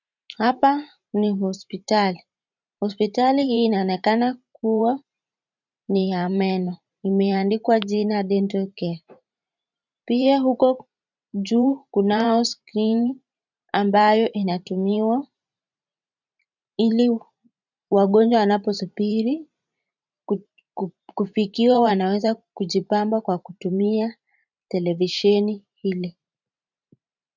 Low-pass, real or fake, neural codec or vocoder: 7.2 kHz; fake; vocoder, 44.1 kHz, 128 mel bands every 512 samples, BigVGAN v2